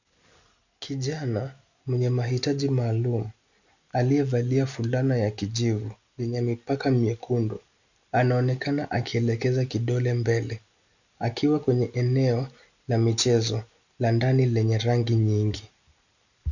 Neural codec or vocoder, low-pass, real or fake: none; 7.2 kHz; real